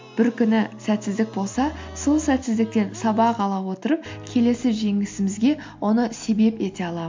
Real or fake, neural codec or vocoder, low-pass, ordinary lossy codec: real; none; 7.2 kHz; MP3, 48 kbps